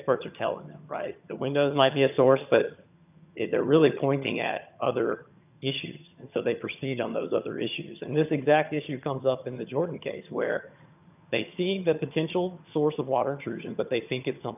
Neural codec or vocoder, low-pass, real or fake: vocoder, 22.05 kHz, 80 mel bands, HiFi-GAN; 3.6 kHz; fake